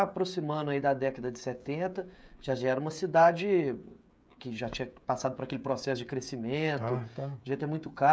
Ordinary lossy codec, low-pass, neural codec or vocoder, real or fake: none; none; codec, 16 kHz, 16 kbps, FreqCodec, smaller model; fake